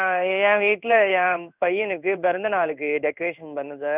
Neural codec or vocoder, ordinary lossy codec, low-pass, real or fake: codec, 16 kHz in and 24 kHz out, 1 kbps, XY-Tokenizer; none; 3.6 kHz; fake